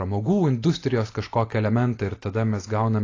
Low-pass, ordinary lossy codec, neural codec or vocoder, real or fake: 7.2 kHz; AAC, 32 kbps; none; real